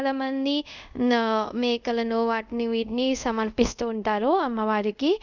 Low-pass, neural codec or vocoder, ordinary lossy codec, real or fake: 7.2 kHz; codec, 24 kHz, 0.5 kbps, DualCodec; none; fake